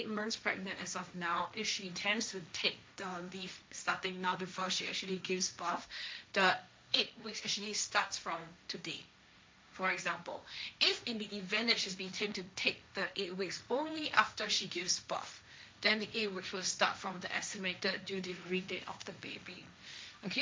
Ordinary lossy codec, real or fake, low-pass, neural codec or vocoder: none; fake; none; codec, 16 kHz, 1.1 kbps, Voila-Tokenizer